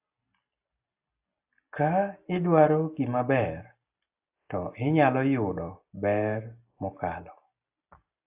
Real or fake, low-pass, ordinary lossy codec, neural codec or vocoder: real; 3.6 kHz; AAC, 32 kbps; none